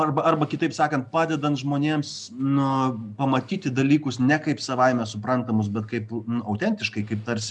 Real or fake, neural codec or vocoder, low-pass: real; none; 10.8 kHz